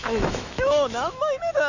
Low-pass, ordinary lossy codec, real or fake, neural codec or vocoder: 7.2 kHz; none; fake; autoencoder, 48 kHz, 128 numbers a frame, DAC-VAE, trained on Japanese speech